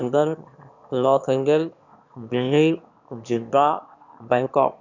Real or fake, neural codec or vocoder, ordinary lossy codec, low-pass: fake; autoencoder, 22.05 kHz, a latent of 192 numbers a frame, VITS, trained on one speaker; none; 7.2 kHz